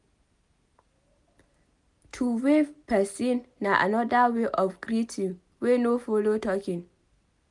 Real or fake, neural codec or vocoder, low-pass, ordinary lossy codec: real; none; 10.8 kHz; none